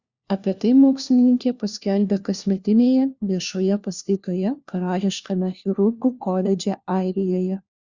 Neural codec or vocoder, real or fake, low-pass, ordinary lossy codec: codec, 16 kHz, 1 kbps, FunCodec, trained on LibriTTS, 50 frames a second; fake; 7.2 kHz; Opus, 64 kbps